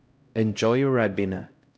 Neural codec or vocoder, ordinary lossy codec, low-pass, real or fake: codec, 16 kHz, 0.5 kbps, X-Codec, HuBERT features, trained on LibriSpeech; none; none; fake